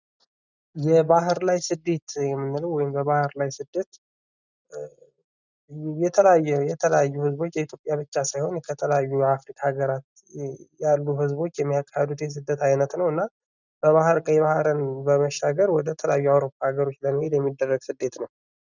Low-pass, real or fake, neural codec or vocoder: 7.2 kHz; real; none